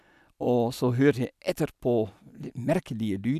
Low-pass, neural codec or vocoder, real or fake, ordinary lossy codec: 14.4 kHz; none; real; none